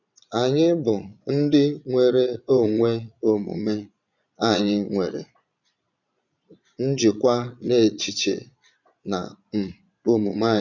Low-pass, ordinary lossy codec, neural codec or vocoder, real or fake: 7.2 kHz; none; vocoder, 22.05 kHz, 80 mel bands, Vocos; fake